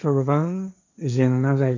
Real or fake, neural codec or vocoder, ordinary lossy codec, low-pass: fake; codec, 16 kHz, 1.1 kbps, Voila-Tokenizer; none; 7.2 kHz